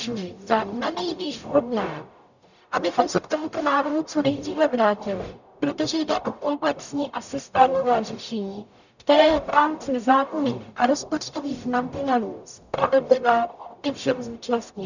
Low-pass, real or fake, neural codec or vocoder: 7.2 kHz; fake; codec, 44.1 kHz, 0.9 kbps, DAC